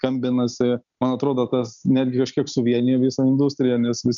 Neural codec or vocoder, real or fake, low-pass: none; real; 7.2 kHz